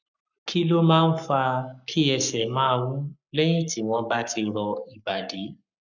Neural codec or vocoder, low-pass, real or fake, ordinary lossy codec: codec, 44.1 kHz, 7.8 kbps, Pupu-Codec; 7.2 kHz; fake; none